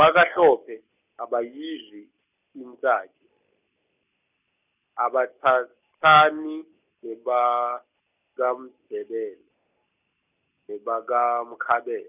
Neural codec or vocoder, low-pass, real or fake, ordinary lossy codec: none; 3.6 kHz; real; none